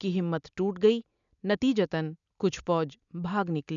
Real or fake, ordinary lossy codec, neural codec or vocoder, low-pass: real; none; none; 7.2 kHz